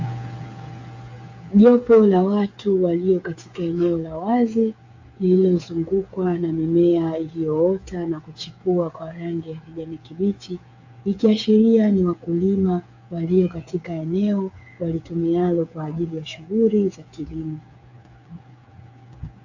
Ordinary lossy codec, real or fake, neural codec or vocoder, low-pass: AAC, 48 kbps; fake; codec, 16 kHz, 8 kbps, FreqCodec, smaller model; 7.2 kHz